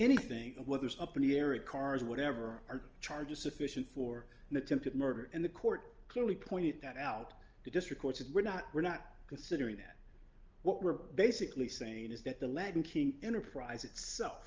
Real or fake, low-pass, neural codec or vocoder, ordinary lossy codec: real; 7.2 kHz; none; Opus, 24 kbps